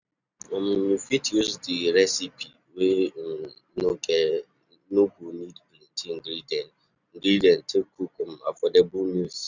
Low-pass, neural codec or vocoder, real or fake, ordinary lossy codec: 7.2 kHz; none; real; none